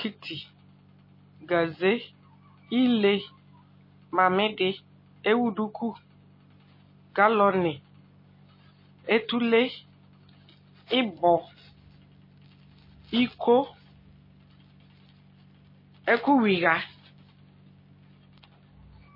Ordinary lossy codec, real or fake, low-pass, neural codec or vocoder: MP3, 24 kbps; real; 5.4 kHz; none